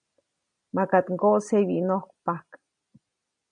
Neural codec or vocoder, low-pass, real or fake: none; 9.9 kHz; real